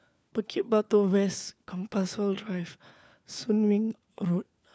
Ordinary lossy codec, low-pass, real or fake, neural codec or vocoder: none; none; fake; codec, 16 kHz, 4 kbps, FunCodec, trained on LibriTTS, 50 frames a second